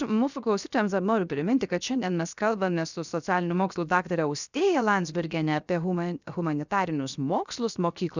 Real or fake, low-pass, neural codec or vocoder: fake; 7.2 kHz; codec, 16 kHz, 0.7 kbps, FocalCodec